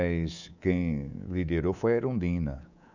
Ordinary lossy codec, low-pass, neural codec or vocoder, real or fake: none; 7.2 kHz; codec, 24 kHz, 3.1 kbps, DualCodec; fake